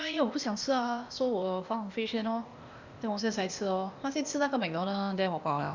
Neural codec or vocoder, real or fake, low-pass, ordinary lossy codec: codec, 16 kHz, 0.8 kbps, ZipCodec; fake; 7.2 kHz; Opus, 64 kbps